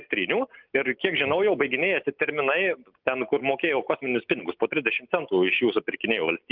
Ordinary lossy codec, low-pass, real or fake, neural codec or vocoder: Opus, 24 kbps; 5.4 kHz; real; none